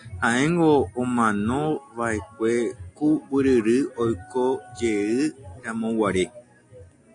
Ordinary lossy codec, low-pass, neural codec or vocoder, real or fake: AAC, 48 kbps; 9.9 kHz; none; real